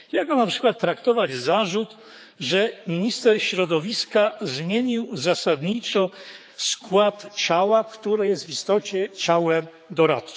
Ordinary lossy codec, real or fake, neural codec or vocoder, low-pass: none; fake; codec, 16 kHz, 4 kbps, X-Codec, HuBERT features, trained on general audio; none